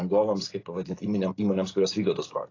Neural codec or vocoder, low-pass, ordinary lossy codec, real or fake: none; 7.2 kHz; AAC, 32 kbps; real